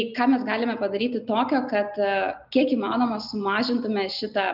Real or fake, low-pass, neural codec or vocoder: real; 5.4 kHz; none